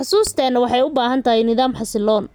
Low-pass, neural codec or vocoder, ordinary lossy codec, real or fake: none; none; none; real